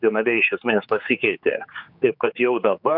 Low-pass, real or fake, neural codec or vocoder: 5.4 kHz; fake; codec, 16 kHz, 4 kbps, X-Codec, HuBERT features, trained on general audio